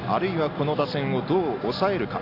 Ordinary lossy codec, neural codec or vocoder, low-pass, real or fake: AAC, 32 kbps; none; 5.4 kHz; real